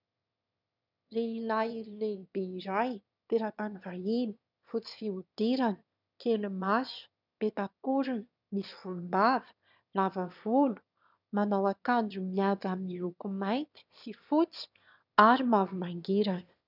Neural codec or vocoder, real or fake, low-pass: autoencoder, 22.05 kHz, a latent of 192 numbers a frame, VITS, trained on one speaker; fake; 5.4 kHz